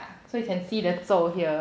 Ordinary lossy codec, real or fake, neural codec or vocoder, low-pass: none; real; none; none